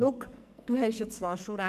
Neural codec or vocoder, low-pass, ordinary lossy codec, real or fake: codec, 44.1 kHz, 2.6 kbps, SNAC; 14.4 kHz; none; fake